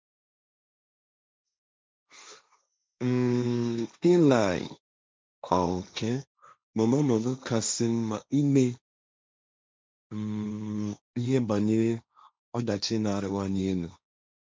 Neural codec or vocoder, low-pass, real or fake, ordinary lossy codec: codec, 16 kHz, 1.1 kbps, Voila-Tokenizer; none; fake; none